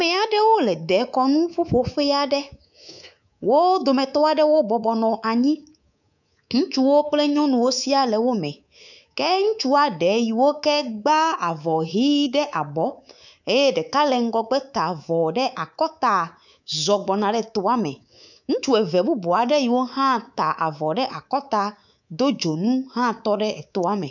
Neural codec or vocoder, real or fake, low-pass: codec, 24 kHz, 3.1 kbps, DualCodec; fake; 7.2 kHz